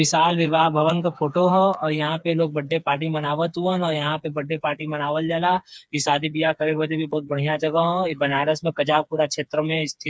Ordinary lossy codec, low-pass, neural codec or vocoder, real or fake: none; none; codec, 16 kHz, 4 kbps, FreqCodec, smaller model; fake